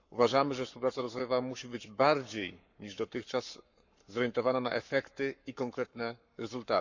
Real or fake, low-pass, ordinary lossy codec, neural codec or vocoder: fake; 7.2 kHz; none; codec, 44.1 kHz, 7.8 kbps, Pupu-Codec